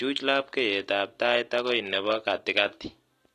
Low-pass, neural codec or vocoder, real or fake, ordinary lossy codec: 14.4 kHz; none; real; AAC, 48 kbps